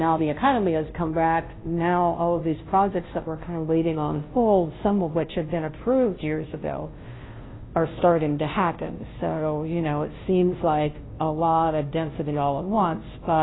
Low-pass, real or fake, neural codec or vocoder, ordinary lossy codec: 7.2 kHz; fake; codec, 16 kHz, 0.5 kbps, FunCodec, trained on Chinese and English, 25 frames a second; AAC, 16 kbps